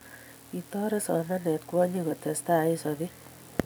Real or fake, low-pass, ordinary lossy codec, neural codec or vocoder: fake; none; none; vocoder, 44.1 kHz, 128 mel bands every 256 samples, BigVGAN v2